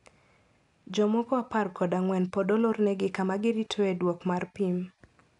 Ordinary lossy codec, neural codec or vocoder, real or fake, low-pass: none; none; real; 10.8 kHz